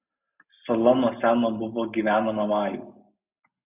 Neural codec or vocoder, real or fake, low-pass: none; real; 3.6 kHz